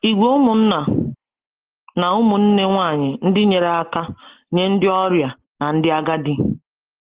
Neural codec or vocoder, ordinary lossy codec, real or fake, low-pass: none; Opus, 16 kbps; real; 3.6 kHz